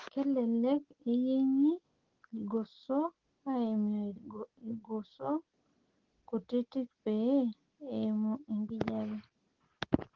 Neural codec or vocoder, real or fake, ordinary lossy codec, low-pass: none; real; Opus, 16 kbps; 7.2 kHz